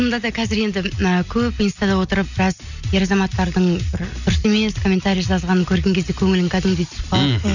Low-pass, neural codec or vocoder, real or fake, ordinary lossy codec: 7.2 kHz; none; real; none